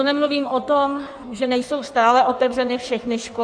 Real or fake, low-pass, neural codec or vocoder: fake; 9.9 kHz; codec, 16 kHz in and 24 kHz out, 1.1 kbps, FireRedTTS-2 codec